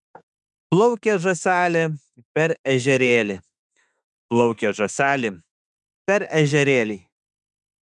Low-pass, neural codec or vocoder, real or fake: 10.8 kHz; autoencoder, 48 kHz, 32 numbers a frame, DAC-VAE, trained on Japanese speech; fake